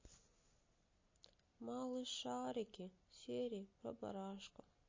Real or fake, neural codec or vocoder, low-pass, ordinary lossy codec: real; none; 7.2 kHz; MP3, 32 kbps